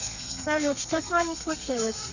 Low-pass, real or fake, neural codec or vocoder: 7.2 kHz; fake; codec, 32 kHz, 1.9 kbps, SNAC